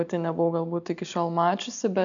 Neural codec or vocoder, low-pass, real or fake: none; 7.2 kHz; real